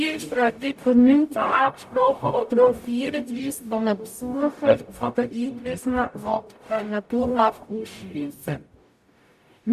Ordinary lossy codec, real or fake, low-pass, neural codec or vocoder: AAC, 96 kbps; fake; 14.4 kHz; codec, 44.1 kHz, 0.9 kbps, DAC